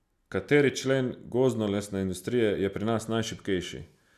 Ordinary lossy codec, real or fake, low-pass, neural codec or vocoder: none; real; 14.4 kHz; none